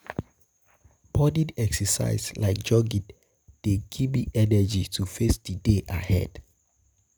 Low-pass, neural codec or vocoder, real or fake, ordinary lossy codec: none; none; real; none